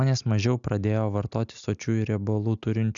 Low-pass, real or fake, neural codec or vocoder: 7.2 kHz; real; none